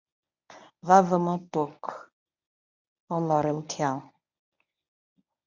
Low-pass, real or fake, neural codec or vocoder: 7.2 kHz; fake; codec, 24 kHz, 0.9 kbps, WavTokenizer, medium speech release version 1